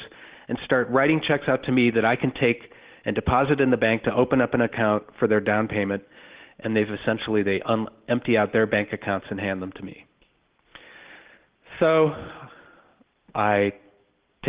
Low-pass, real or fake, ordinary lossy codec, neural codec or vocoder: 3.6 kHz; real; Opus, 16 kbps; none